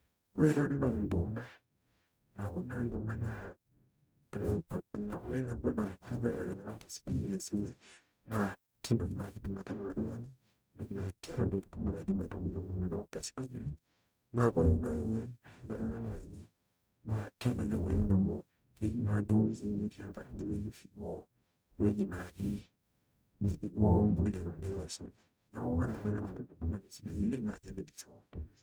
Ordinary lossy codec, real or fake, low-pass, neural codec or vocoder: none; fake; none; codec, 44.1 kHz, 0.9 kbps, DAC